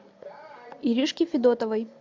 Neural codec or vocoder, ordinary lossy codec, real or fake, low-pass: none; MP3, 64 kbps; real; 7.2 kHz